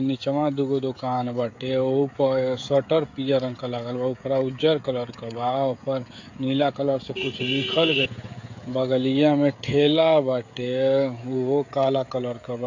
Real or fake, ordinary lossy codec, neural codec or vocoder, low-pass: fake; AAC, 48 kbps; codec, 16 kHz, 16 kbps, FreqCodec, smaller model; 7.2 kHz